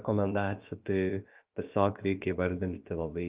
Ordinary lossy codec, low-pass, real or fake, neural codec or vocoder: Opus, 32 kbps; 3.6 kHz; fake; codec, 16 kHz, about 1 kbps, DyCAST, with the encoder's durations